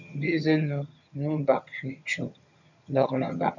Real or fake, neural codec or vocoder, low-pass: fake; vocoder, 22.05 kHz, 80 mel bands, HiFi-GAN; 7.2 kHz